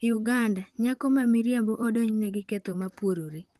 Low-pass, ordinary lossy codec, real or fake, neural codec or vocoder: 14.4 kHz; Opus, 24 kbps; fake; vocoder, 44.1 kHz, 128 mel bands, Pupu-Vocoder